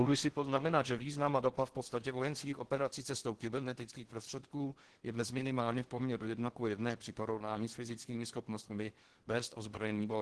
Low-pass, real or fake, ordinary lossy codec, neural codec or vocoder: 10.8 kHz; fake; Opus, 16 kbps; codec, 16 kHz in and 24 kHz out, 0.6 kbps, FocalCodec, streaming, 4096 codes